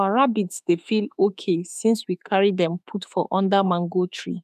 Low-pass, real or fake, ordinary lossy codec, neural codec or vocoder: 14.4 kHz; fake; none; autoencoder, 48 kHz, 32 numbers a frame, DAC-VAE, trained on Japanese speech